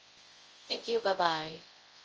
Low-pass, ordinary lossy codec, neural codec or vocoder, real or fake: 7.2 kHz; Opus, 24 kbps; codec, 24 kHz, 0.9 kbps, WavTokenizer, large speech release; fake